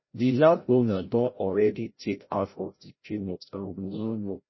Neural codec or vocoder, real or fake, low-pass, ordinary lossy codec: codec, 16 kHz, 0.5 kbps, FreqCodec, larger model; fake; 7.2 kHz; MP3, 24 kbps